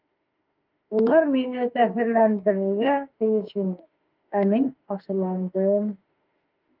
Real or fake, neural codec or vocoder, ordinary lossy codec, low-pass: fake; autoencoder, 48 kHz, 32 numbers a frame, DAC-VAE, trained on Japanese speech; Opus, 24 kbps; 5.4 kHz